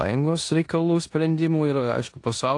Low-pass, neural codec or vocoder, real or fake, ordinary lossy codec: 10.8 kHz; codec, 16 kHz in and 24 kHz out, 0.9 kbps, LongCat-Audio-Codec, four codebook decoder; fake; AAC, 48 kbps